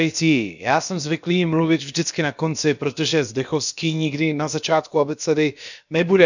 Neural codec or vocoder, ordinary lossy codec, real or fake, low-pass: codec, 16 kHz, about 1 kbps, DyCAST, with the encoder's durations; none; fake; 7.2 kHz